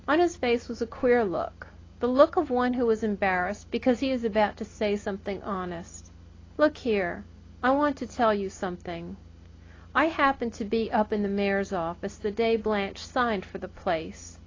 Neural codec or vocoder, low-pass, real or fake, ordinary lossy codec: none; 7.2 kHz; real; AAC, 32 kbps